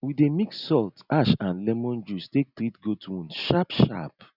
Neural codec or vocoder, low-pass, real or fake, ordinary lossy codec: none; 5.4 kHz; real; none